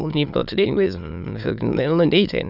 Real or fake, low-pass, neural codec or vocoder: fake; 5.4 kHz; autoencoder, 22.05 kHz, a latent of 192 numbers a frame, VITS, trained on many speakers